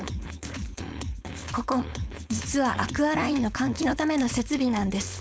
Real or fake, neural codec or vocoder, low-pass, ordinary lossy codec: fake; codec, 16 kHz, 4.8 kbps, FACodec; none; none